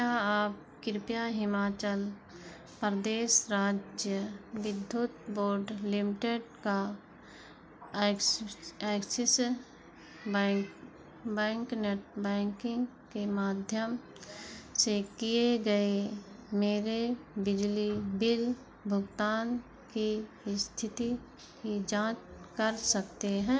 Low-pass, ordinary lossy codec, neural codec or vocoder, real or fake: none; none; none; real